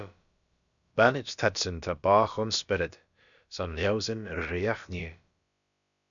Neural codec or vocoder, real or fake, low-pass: codec, 16 kHz, about 1 kbps, DyCAST, with the encoder's durations; fake; 7.2 kHz